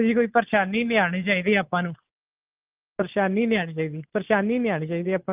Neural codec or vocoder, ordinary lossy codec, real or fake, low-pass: none; Opus, 24 kbps; real; 3.6 kHz